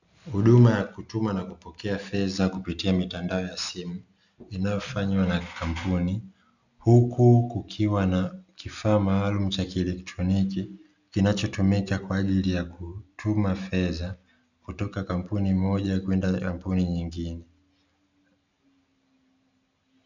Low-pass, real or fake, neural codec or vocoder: 7.2 kHz; real; none